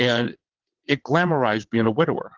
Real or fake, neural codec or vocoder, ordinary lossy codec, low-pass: fake; vocoder, 22.05 kHz, 80 mel bands, WaveNeXt; Opus, 16 kbps; 7.2 kHz